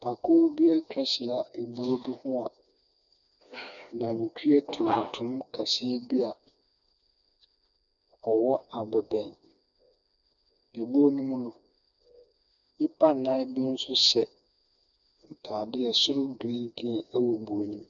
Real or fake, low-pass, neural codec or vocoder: fake; 7.2 kHz; codec, 16 kHz, 2 kbps, FreqCodec, smaller model